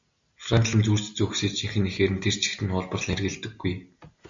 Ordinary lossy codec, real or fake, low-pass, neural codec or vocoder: MP3, 64 kbps; real; 7.2 kHz; none